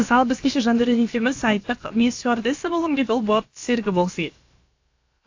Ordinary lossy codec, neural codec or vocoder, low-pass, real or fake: AAC, 48 kbps; codec, 16 kHz, about 1 kbps, DyCAST, with the encoder's durations; 7.2 kHz; fake